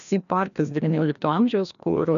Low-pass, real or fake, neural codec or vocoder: 7.2 kHz; fake; codec, 16 kHz, 1 kbps, FreqCodec, larger model